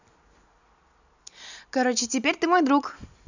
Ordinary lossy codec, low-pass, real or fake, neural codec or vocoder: none; 7.2 kHz; real; none